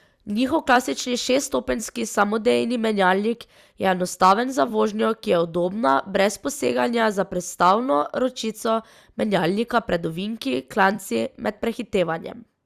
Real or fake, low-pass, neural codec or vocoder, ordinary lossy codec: fake; 14.4 kHz; vocoder, 44.1 kHz, 128 mel bands every 256 samples, BigVGAN v2; Opus, 64 kbps